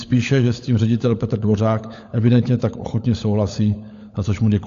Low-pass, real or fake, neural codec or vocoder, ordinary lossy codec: 7.2 kHz; fake; codec, 16 kHz, 16 kbps, FunCodec, trained on LibriTTS, 50 frames a second; AAC, 64 kbps